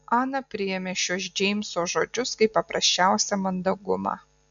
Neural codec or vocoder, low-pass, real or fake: none; 7.2 kHz; real